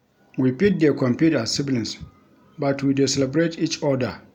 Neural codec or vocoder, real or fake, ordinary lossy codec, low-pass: none; real; none; 19.8 kHz